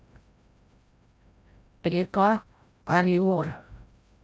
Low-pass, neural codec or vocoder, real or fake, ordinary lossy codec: none; codec, 16 kHz, 0.5 kbps, FreqCodec, larger model; fake; none